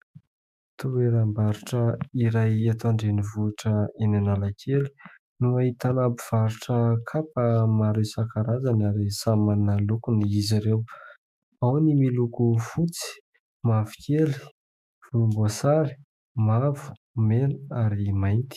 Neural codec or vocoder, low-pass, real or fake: autoencoder, 48 kHz, 128 numbers a frame, DAC-VAE, trained on Japanese speech; 14.4 kHz; fake